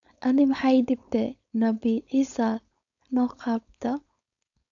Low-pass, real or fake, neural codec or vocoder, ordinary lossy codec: 7.2 kHz; fake; codec, 16 kHz, 4.8 kbps, FACodec; none